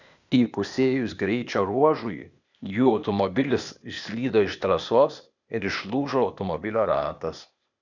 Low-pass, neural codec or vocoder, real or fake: 7.2 kHz; codec, 16 kHz, 0.8 kbps, ZipCodec; fake